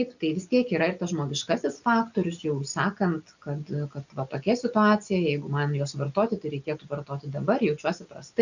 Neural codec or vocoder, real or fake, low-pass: none; real; 7.2 kHz